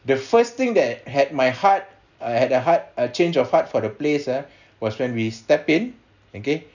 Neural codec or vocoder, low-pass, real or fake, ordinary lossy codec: none; 7.2 kHz; real; none